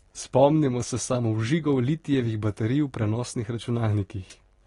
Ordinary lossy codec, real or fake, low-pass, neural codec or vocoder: AAC, 32 kbps; real; 10.8 kHz; none